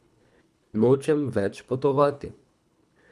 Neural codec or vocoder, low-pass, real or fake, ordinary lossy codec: codec, 24 kHz, 3 kbps, HILCodec; none; fake; none